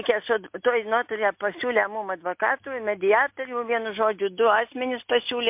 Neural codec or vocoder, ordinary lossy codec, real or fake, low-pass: none; MP3, 32 kbps; real; 3.6 kHz